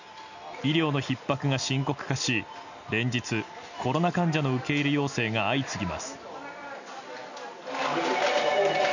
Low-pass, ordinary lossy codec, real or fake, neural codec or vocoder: 7.2 kHz; none; real; none